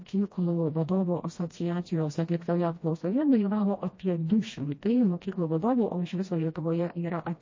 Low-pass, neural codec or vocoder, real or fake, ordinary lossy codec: 7.2 kHz; codec, 16 kHz, 1 kbps, FreqCodec, smaller model; fake; MP3, 32 kbps